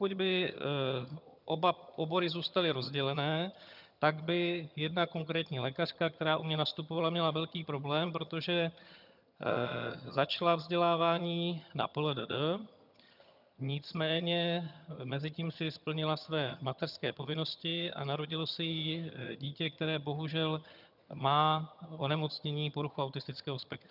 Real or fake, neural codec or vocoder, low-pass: fake; vocoder, 22.05 kHz, 80 mel bands, HiFi-GAN; 5.4 kHz